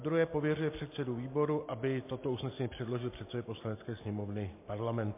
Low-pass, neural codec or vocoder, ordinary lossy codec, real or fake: 3.6 kHz; none; MP3, 24 kbps; real